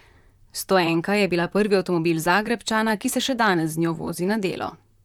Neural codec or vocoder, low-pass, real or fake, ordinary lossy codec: vocoder, 44.1 kHz, 128 mel bands, Pupu-Vocoder; 19.8 kHz; fake; none